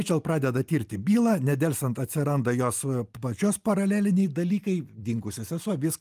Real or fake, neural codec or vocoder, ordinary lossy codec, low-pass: real; none; Opus, 32 kbps; 14.4 kHz